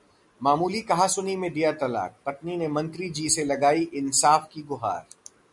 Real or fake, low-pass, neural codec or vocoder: real; 10.8 kHz; none